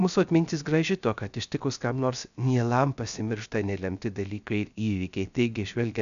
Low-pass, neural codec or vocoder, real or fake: 7.2 kHz; codec, 16 kHz, 0.3 kbps, FocalCodec; fake